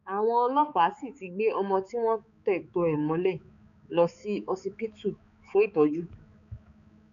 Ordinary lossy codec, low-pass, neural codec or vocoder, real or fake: AAC, 96 kbps; 7.2 kHz; codec, 16 kHz, 4 kbps, X-Codec, HuBERT features, trained on balanced general audio; fake